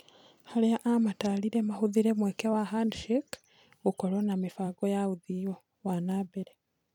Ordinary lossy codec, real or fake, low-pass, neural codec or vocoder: none; fake; 19.8 kHz; vocoder, 44.1 kHz, 128 mel bands every 256 samples, BigVGAN v2